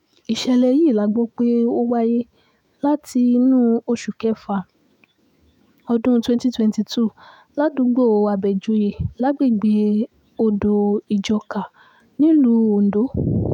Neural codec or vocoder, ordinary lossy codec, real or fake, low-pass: autoencoder, 48 kHz, 128 numbers a frame, DAC-VAE, trained on Japanese speech; none; fake; 19.8 kHz